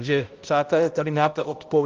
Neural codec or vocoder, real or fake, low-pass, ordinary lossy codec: codec, 16 kHz, 0.5 kbps, X-Codec, HuBERT features, trained on balanced general audio; fake; 7.2 kHz; Opus, 32 kbps